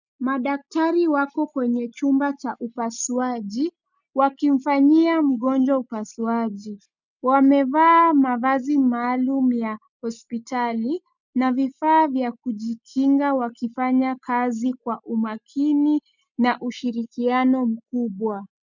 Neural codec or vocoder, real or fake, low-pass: none; real; 7.2 kHz